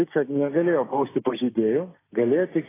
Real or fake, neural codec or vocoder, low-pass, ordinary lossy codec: fake; codec, 16 kHz, 6 kbps, DAC; 3.6 kHz; AAC, 16 kbps